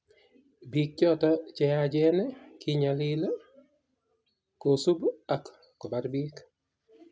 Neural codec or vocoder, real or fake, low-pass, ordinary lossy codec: none; real; none; none